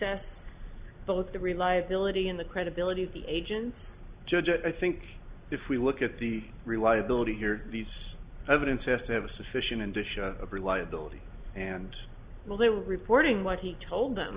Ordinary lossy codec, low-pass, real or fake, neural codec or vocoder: Opus, 64 kbps; 3.6 kHz; real; none